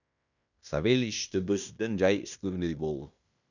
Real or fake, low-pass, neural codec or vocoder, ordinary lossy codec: fake; 7.2 kHz; codec, 16 kHz in and 24 kHz out, 0.9 kbps, LongCat-Audio-Codec, fine tuned four codebook decoder; none